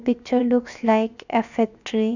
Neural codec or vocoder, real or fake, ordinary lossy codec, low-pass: codec, 16 kHz, 0.7 kbps, FocalCodec; fake; none; 7.2 kHz